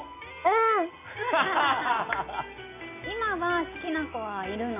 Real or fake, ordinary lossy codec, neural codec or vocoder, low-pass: real; none; none; 3.6 kHz